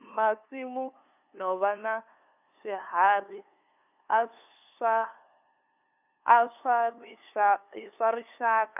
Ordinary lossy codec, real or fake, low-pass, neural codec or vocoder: none; fake; 3.6 kHz; codec, 16 kHz, 2 kbps, FunCodec, trained on LibriTTS, 25 frames a second